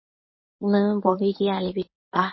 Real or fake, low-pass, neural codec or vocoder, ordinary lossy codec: fake; 7.2 kHz; codec, 24 kHz, 0.9 kbps, WavTokenizer, medium speech release version 1; MP3, 24 kbps